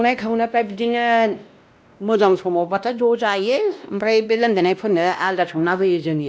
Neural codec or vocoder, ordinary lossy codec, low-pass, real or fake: codec, 16 kHz, 1 kbps, X-Codec, WavLM features, trained on Multilingual LibriSpeech; none; none; fake